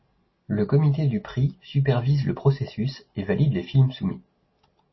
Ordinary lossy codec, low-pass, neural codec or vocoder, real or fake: MP3, 24 kbps; 7.2 kHz; none; real